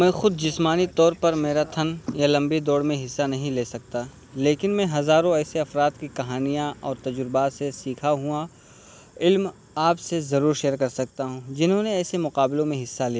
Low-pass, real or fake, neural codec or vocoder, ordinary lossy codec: none; real; none; none